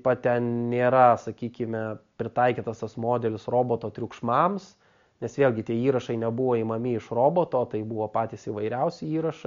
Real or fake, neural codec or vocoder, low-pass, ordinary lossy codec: real; none; 7.2 kHz; MP3, 48 kbps